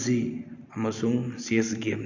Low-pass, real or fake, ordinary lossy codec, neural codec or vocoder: 7.2 kHz; real; Opus, 64 kbps; none